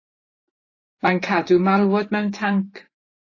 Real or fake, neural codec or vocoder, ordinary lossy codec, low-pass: fake; vocoder, 44.1 kHz, 128 mel bands every 512 samples, BigVGAN v2; AAC, 32 kbps; 7.2 kHz